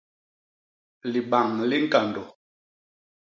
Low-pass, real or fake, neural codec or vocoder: 7.2 kHz; real; none